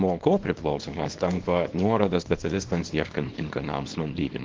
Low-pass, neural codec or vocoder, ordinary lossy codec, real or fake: 7.2 kHz; codec, 24 kHz, 0.9 kbps, WavTokenizer, small release; Opus, 16 kbps; fake